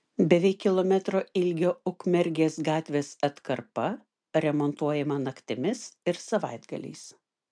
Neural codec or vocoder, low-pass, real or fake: none; 9.9 kHz; real